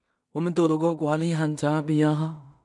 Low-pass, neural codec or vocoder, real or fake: 10.8 kHz; codec, 16 kHz in and 24 kHz out, 0.4 kbps, LongCat-Audio-Codec, two codebook decoder; fake